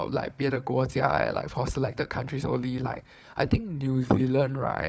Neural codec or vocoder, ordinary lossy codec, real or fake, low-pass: codec, 16 kHz, 4 kbps, FunCodec, trained on Chinese and English, 50 frames a second; none; fake; none